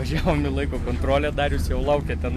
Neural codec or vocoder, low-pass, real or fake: none; 14.4 kHz; real